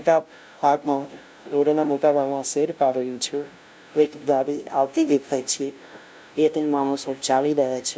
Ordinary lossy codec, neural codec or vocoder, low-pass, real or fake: none; codec, 16 kHz, 0.5 kbps, FunCodec, trained on LibriTTS, 25 frames a second; none; fake